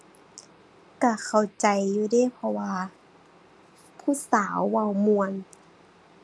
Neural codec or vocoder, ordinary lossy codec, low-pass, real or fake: none; none; none; real